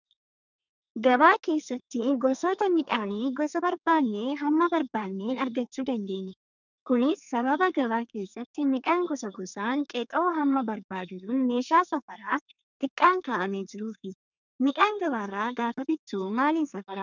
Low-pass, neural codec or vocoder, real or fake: 7.2 kHz; codec, 32 kHz, 1.9 kbps, SNAC; fake